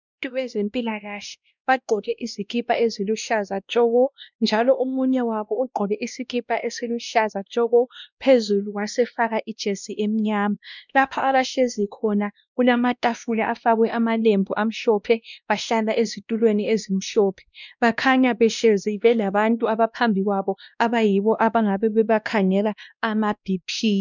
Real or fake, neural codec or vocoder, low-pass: fake; codec, 16 kHz, 1 kbps, X-Codec, WavLM features, trained on Multilingual LibriSpeech; 7.2 kHz